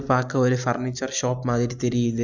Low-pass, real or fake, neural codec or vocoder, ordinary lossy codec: 7.2 kHz; real; none; none